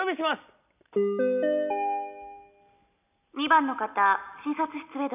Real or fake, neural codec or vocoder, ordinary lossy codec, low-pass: real; none; none; 3.6 kHz